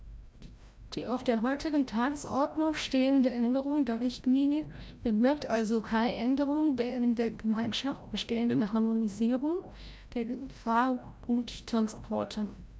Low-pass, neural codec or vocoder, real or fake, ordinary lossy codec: none; codec, 16 kHz, 0.5 kbps, FreqCodec, larger model; fake; none